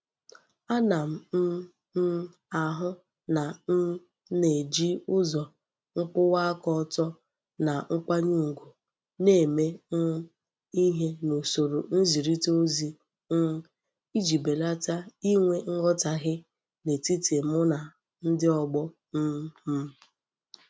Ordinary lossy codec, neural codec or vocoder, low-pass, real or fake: none; none; none; real